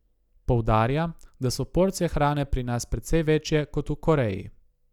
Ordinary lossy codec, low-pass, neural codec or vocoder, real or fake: none; 19.8 kHz; none; real